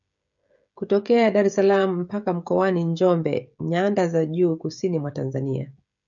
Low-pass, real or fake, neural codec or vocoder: 7.2 kHz; fake; codec, 16 kHz, 16 kbps, FreqCodec, smaller model